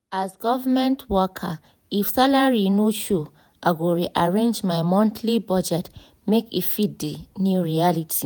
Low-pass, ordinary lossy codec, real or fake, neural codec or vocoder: none; none; fake; vocoder, 48 kHz, 128 mel bands, Vocos